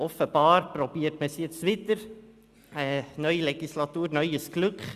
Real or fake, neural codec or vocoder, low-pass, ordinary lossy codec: real; none; 14.4 kHz; Opus, 64 kbps